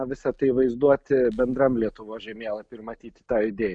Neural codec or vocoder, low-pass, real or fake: none; 9.9 kHz; real